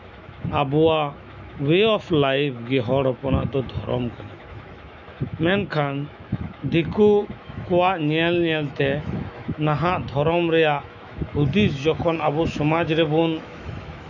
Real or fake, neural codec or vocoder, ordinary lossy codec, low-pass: fake; autoencoder, 48 kHz, 128 numbers a frame, DAC-VAE, trained on Japanese speech; none; 7.2 kHz